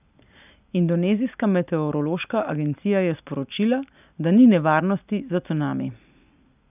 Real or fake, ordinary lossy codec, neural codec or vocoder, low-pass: real; none; none; 3.6 kHz